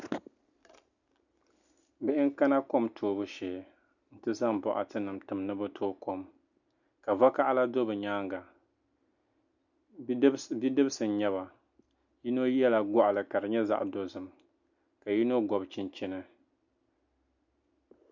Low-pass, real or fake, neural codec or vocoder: 7.2 kHz; real; none